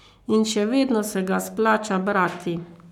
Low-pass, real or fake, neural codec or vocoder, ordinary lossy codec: 19.8 kHz; fake; codec, 44.1 kHz, 7.8 kbps, Pupu-Codec; none